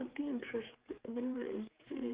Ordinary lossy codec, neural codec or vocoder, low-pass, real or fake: Opus, 32 kbps; codec, 16 kHz, 4 kbps, X-Codec, HuBERT features, trained on general audio; 3.6 kHz; fake